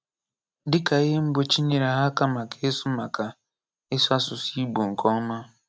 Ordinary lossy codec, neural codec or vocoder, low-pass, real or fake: none; none; none; real